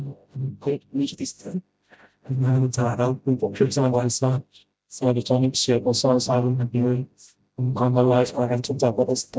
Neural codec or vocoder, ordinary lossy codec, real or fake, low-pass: codec, 16 kHz, 0.5 kbps, FreqCodec, smaller model; none; fake; none